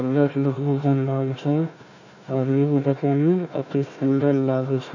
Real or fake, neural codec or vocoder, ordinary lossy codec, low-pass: fake; codec, 16 kHz, 1 kbps, FunCodec, trained on Chinese and English, 50 frames a second; none; 7.2 kHz